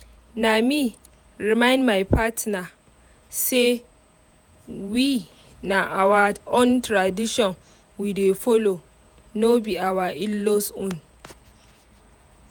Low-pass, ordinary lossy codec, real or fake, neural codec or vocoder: none; none; fake; vocoder, 48 kHz, 128 mel bands, Vocos